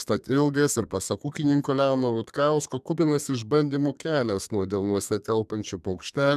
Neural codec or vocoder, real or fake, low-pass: codec, 32 kHz, 1.9 kbps, SNAC; fake; 14.4 kHz